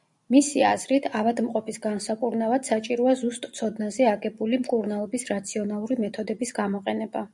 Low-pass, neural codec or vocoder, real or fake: 10.8 kHz; none; real